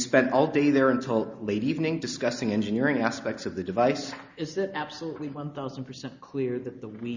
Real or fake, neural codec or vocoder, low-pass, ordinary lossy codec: real; none; 7.2 kHz; Opus, 64 kbps